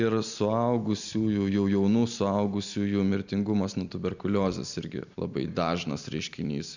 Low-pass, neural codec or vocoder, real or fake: 7.2 kHz; none; real